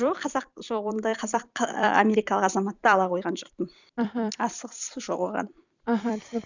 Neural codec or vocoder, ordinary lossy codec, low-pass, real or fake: vocoder, 44.1 kHz, 128 mel bands every 256 samples, BigVGAN v2; none; 7.2 kHz; fake